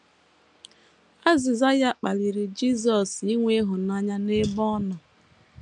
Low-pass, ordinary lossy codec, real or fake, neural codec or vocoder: 10.8 kHz; none; real; none